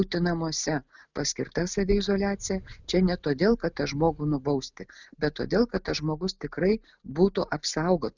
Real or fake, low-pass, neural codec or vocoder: real; 7.2 kHz; none